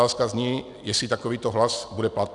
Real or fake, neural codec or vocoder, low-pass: real; none; 10.8 kHz